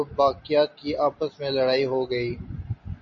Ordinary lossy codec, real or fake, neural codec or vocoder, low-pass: MP3, 32 kbps; real; none; 7.2 kHz